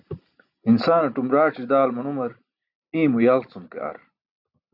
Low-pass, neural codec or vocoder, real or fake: 5.4 kHz; none; real